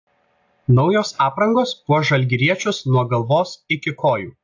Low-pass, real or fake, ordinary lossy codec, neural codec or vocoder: 7.2 kHz; real; AAC, 48 kbps; none